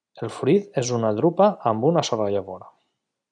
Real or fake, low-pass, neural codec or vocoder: real; 9.9 kHz; none